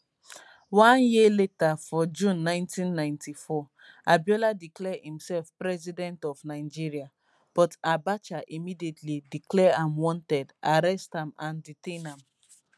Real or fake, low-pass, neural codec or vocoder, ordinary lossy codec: real; none; none; none